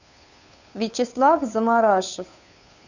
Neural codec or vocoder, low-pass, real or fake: codec, 16 kHz, 2 kbps, FunCodec, trained on Chinese and English, 25 frames a second; 7.2 kHz; fake